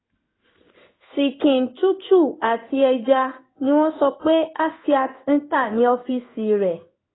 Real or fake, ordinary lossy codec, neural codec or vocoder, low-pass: fake; AAC, 16 kbps; codec, 16 kHz in and 24 kHz out, 1 kbps, XY-Tokenizer; 7.2 kHz